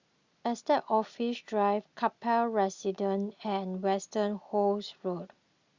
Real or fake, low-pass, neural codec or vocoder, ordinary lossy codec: real; 7.2 kHz; none; Opus, 64 kbps